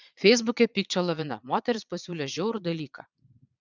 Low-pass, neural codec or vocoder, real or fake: 7.2 kHz; vocoder, 22.05 kHz, 80 mel bands, Vocos; fake